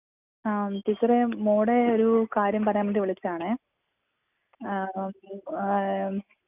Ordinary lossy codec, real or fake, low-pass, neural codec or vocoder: none; real; 3.6 kHz; none